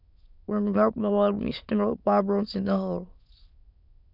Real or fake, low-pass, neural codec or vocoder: fake; 5.4 kHz; autoencoder, 22.05 kHz, a latent of 192 numbers a frame, VITS, trained on many speakers